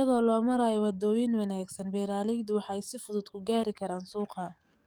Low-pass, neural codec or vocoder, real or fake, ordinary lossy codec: none; codec, 44.1 kHz, 7.8 kbps, DAC; fake; none